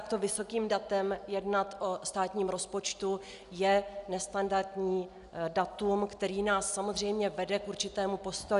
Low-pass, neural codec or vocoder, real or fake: 10.8 kHz; none; real